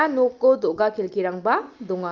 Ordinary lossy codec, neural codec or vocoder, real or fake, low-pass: Opus, 24 kbps; none; real; 7.2 kHz